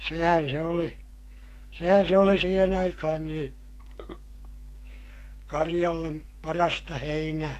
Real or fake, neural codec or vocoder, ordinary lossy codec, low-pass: fake; codec, 44.1 kHz, 2.6 kbps, SNAC; AAC, 64 kbps; 14.4 kHz